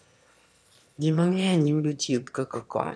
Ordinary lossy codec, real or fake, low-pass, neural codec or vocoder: none; fake; none; autoencoder, 22.05 kHz, a latent of 192 numbers a frame, VITS, trained on one speaker